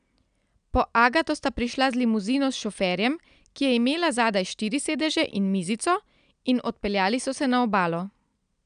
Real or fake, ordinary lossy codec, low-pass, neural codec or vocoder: real; none; 9.9 kHz; none